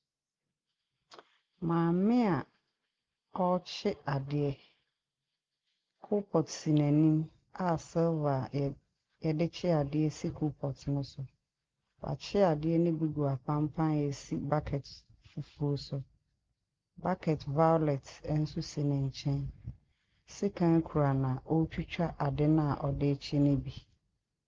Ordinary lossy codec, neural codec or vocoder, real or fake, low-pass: Opus, 32 kbps; none; real; 7.2 kHz